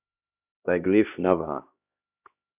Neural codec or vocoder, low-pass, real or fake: codec, 16 kHz, 1 kbps, X-Codec, HuBERT features, trained on LibriSpeech; 3.6 kHz; fake